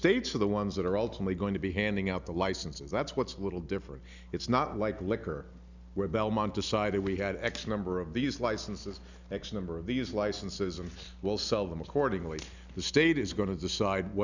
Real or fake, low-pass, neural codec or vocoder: real; 7.2 kHz; none